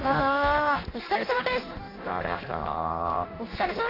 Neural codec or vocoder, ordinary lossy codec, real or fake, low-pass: codec, 16 kHz in and 24 kHz out, 0.6 kbps, FireRedTTS-2 codec; AAC, 24 kbps; fake; 5.4 kHz